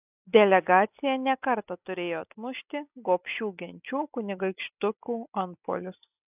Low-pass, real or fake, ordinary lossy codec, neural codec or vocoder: 3.6 kHz; real; AAC, 32 kbps; none